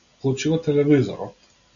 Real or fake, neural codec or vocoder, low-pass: real; none; 7.2 kHz